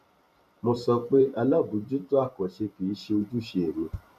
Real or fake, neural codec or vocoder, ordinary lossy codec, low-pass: fake; vocoder, 44.1 kHz, 128 mel bands every 512 samples, BigVGAN v2; none; 14.4 kHz